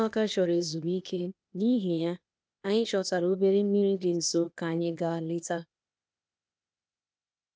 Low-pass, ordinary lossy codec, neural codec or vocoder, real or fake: none; none; codec, 16 kHz, 0.8 kbps, ZipCodec; fake